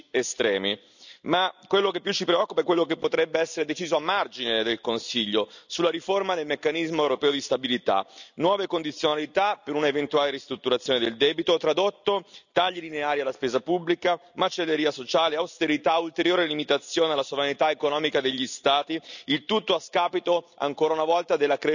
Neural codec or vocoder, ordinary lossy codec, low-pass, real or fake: none; none; 7.2 kHz; real